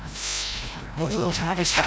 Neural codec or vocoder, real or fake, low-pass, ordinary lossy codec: codec, 16 kHz, 0.5 kbps, FreqCodec, larger model; fake; none; none